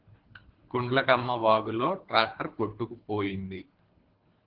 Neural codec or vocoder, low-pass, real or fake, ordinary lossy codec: codec, 24 kHz, 3 kbps, HILCodec; 5.4 kHz; fake; Opus, 16 kbps